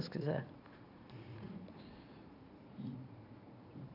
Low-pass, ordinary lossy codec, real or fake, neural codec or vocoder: 5.4 kHz; none; fake; vocoder, 44.1 kHz, 80 mel bands, Vocos